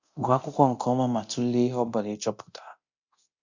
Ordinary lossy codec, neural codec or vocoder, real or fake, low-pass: Opus, 64 kbps; codec, 24 kHz, 0.9 kbps, DualCodec; fake; 7.2 kHz